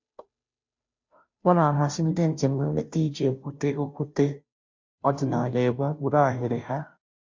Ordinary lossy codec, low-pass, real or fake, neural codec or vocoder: MP3, 48 kbps; 7.2 kHz; fake; codec, 16 kHz, 0.5 kbps, FunCodec, trained on Chinese and English, 25 frames a second